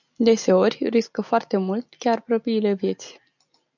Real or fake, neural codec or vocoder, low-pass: real; none; 7.2 kHz